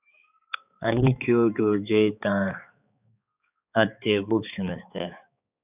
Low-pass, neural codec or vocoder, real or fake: 3.6 kHz; codec, 16 kHz, 4 kbps, X-Codec, HuBERT features, trained on balanced general audio; fake